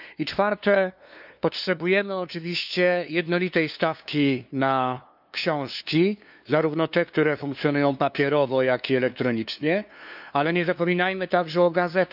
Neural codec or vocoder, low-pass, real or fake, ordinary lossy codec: codec, 16 kHz, 2 kbps, FunCodec, trained on LibriTTS, 25 frames a second; 5.4 kHz; fake; none